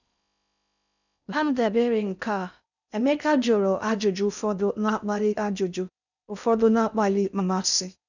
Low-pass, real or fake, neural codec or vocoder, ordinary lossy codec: 7.2 kHz; fake; codec, 16 kHz in and 24 kHz out, 0.6 kbps, FocalCodec, streaming, 4096 codes; none